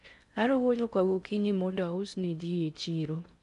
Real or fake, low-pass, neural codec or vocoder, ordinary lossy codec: fake; 10.8 kHz; codec, 16 kHz in and 24 kHz out, 0.6 kbps, FocalCodec, streaming, 4096 codes; none